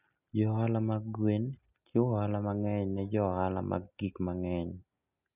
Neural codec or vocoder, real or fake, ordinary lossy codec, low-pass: none; real; none; 3.6 kHz